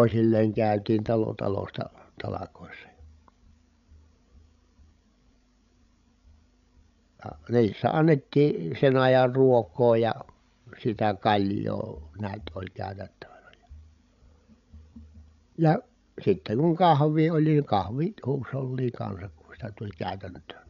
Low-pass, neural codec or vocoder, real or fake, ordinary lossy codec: 7.2 kHz; codec, 16 kHz, 16 kbps, FreqCodec, larger model; fake; none